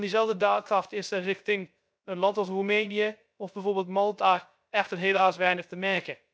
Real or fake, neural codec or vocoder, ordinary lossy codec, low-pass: fake; codec, 16 kHz, 0.3 kbps, FocalCodec; none; none